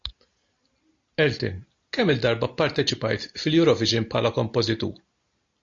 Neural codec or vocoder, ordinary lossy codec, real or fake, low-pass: none; AAC, 48 kbps; real; 7.2 kHz